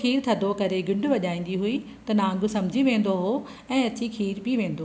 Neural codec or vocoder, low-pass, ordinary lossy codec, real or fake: none; none; none; real